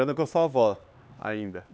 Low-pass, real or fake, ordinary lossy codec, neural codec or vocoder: none; fake; none; codec, 16 kHz, 4 kbps, X-Codec, HuBERT features, trained on LibriSpeech